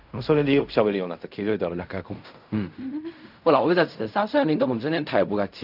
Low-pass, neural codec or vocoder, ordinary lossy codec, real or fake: 5.4 kHz; codec, 16 kHz in and 24 kHz out, 0.4 kbps, LongCat-Audio-Codec, fine tuned four codebook decoder; none; fake